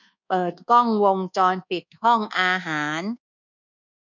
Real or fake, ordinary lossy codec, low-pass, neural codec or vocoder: fake; none; 7.2 kHz; codec, 24 kHz, 1.2 kbps, DualCodec